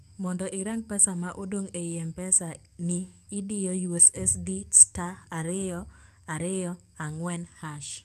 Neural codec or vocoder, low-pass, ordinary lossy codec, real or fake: codec, 44.1 kHz, 7.8 kbps, DAC; 14.4 kHz; none; fake